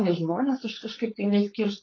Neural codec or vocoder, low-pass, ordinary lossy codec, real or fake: codec, 16 kHz, 4.8 kbps, FACodec; 7.2 kHz; AAC, 32 kbps; fake